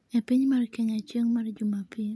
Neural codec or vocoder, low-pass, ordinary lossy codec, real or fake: none; none; none; real